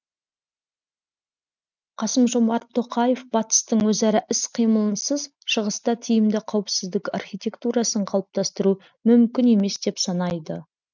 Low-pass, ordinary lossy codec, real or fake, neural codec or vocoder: 7.2 kHz; none; real; none